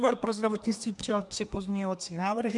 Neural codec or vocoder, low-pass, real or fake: codec, 24 kHz, 1 kbps, SNAC; 10.8 kHz; fake